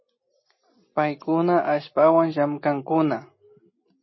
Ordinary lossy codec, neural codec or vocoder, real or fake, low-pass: MP3, 24 kbps; none; real; 7.2 kHz